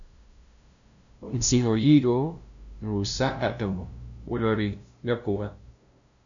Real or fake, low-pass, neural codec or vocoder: fake; 7.2 kHz; codec, 16 kHz, 0.5 kbps, FunCodec, trained on LibriTTS, 25 frames a second